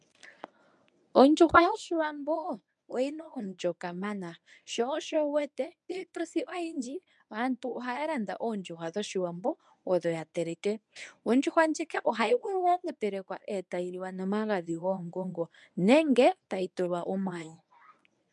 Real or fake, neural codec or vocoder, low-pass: fake; codec, 24 kHz, 0.9 kbps, WavTokenizer, medium speech release version 1; 10.8 kHz